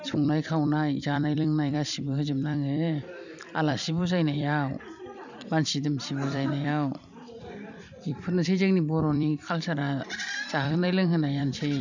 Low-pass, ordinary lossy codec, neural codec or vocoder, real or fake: 7.2 kHz; none; vocoder, 44.1 kHz, 128 mel bands every 256 samples, BigVGAN v2; fake